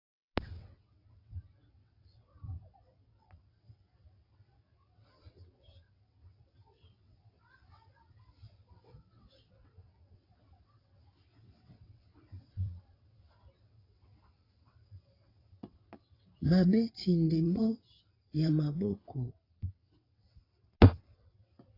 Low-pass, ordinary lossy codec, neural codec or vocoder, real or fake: 5.4 kHz; AAC, 24 kbps; vocoder, 22.05 kHz, 80 mel bands, WaveNeXt; fake